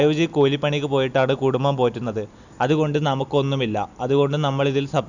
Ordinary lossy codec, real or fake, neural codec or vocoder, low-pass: none; real; none; 7.2 kHz